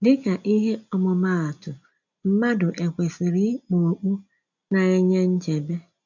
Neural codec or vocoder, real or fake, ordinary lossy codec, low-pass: none; real; none; 7.2 kHz